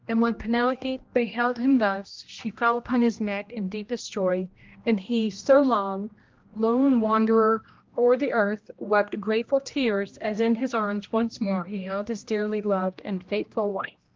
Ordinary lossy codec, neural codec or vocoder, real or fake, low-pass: Opus, 32 kbps; codec, 16 kHz, 1 kbps, X-Codec, HuBERT features, trained on general audio; fake; 7.2 kHz